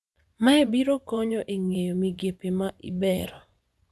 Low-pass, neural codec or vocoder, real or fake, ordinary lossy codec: none; vocoder, 24 kHz, 100 mel bands, Vocos; fake; none